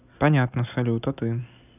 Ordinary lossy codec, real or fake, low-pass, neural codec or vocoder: none; real; 3.6 kHz; none